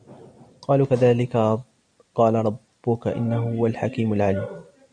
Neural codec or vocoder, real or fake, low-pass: none; real; 9.9 kHz